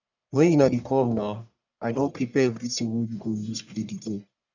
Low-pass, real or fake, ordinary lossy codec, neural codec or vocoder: 7.2 kHz; fake; none; codec, 44.1 kHz, 1.7 kbps, Pupu-Codec